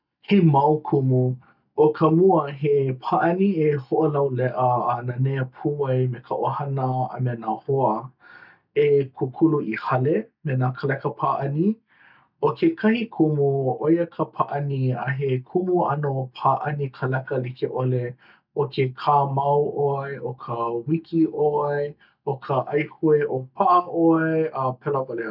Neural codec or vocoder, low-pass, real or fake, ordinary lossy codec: none; 5.4 kHz; real; none